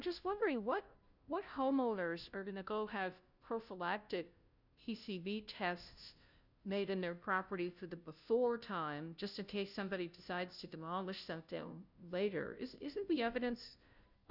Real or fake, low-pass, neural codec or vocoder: fake; 5.4 kHz; codec, 16 kHz, 0.5 kbps, FunCodec, trained on Chinese and English, 25 frames a second